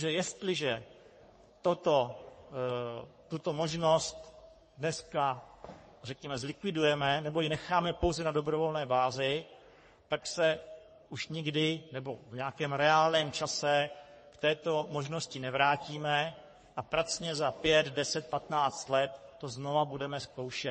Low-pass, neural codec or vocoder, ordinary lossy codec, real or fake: 10.8 kHz; codec, 44.1 kHz, 3.4 kbps, Pupu-Codec; MP3, 32 kbps; fake